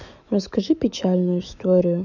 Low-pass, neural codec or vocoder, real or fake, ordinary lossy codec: 7.2 kHz; autoencoder, 48 kHz, 128 numbers a frame, DAC-VAE, trained on Japanese speech; fake; none